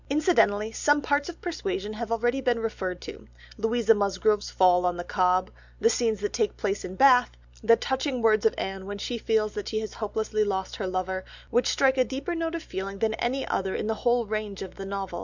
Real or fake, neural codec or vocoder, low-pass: real; none; 7.2 kHz